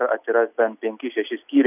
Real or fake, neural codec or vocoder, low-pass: real; none; 3.6 kHz